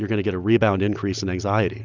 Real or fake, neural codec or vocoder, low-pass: real; none; 7.2 kHz